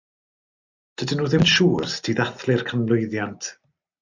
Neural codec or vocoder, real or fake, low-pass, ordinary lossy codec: none; real; 7.2 kHz; MP3, 64 kbps